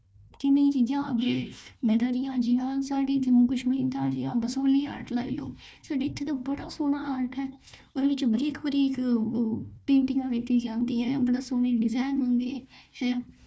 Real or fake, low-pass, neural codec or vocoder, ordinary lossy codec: fake; none; codec, 16 kHz, 1 kbps, FunCodec, trained on Chinese and English, 50 frames a second; none